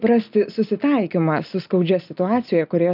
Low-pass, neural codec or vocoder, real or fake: 5.4 kHz; none; real